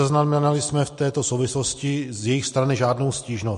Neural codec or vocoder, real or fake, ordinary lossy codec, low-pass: none; real; MP3, 48 kbps; 14.4 kHz